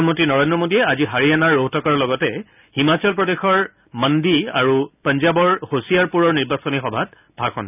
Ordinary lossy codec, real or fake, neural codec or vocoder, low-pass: none; real; none; 3.6 kHz